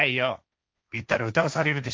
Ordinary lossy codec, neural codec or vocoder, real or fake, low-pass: none; codec, 16 kHz, 1.1 kbps, Voila-Tokenizer; fake; none